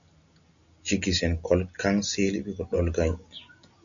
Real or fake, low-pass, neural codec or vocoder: real; 7.2 kHz; none